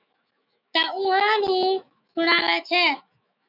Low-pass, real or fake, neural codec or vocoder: 5.4 kHz; fake; autoencoder, 48 kHz, 128 numbers a frame, DAC-VAE, trained on Japanese speech